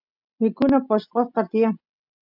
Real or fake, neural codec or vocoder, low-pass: real; none; 5.4 kHz